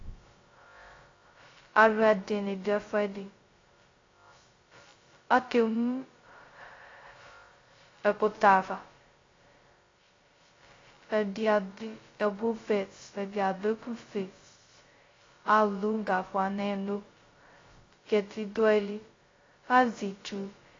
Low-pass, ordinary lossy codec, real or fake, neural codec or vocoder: 7.2 kHz; AAC, 32 kbps; fake; codec, 16 kHz, 0.2 kbps, FocalCodec